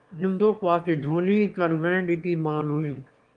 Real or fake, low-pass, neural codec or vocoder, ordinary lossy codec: fake; 9.9 kHz; autoencoder, 22.05 kHz, a latent of 192 numbers a frame, VITS, trained on one speaker; Opus, 24 kbps